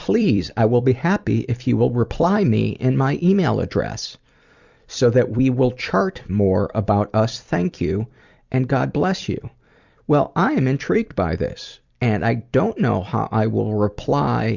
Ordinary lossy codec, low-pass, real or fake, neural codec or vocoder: Opus, 64 kbps; 7.2 kHz; fake; vocoder, 44.1 kHz, 128 mel bands every 256 samples, BigVGAN v2